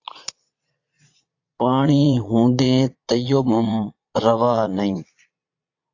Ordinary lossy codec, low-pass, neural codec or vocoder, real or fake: AAC, 48 kbps; 7.2 kHz; vocoder, 22.05 kHz, 80 mel bands, Vocos; fake